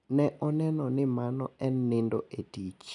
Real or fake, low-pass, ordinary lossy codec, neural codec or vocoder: real; 10.8 kHz; none; none